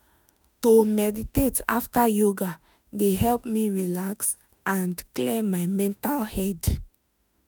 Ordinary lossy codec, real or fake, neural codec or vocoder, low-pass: none; fake; autoencoder, 48 kHz, 32 numbers a frame, DAC-VAE, trained on Japanese speech; none